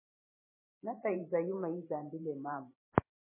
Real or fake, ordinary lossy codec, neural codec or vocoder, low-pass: fake; MP3, 16 kbps; vocoder, 44.1 kHz, 128 mel bands every 512 samples, BigVGAN v2; 3.6 kHz